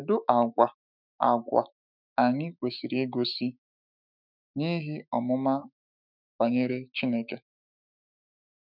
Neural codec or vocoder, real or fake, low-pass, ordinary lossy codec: autoencoder, 48 kHz, 128 numbers a frame, DAC-VAE, trained on Japanese speech; fake; 5.4 kHz; none